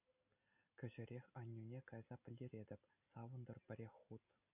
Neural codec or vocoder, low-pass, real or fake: none; 3.6 kHz; real